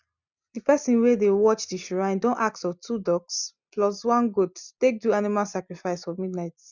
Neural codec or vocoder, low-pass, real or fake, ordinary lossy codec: none; 7.2 kHz; real; none